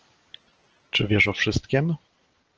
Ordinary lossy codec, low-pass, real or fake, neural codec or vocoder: Opus, 16 kbps; 7.2 kHz; real; none